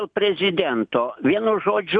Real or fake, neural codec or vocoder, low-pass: real; none; 9.9 kHz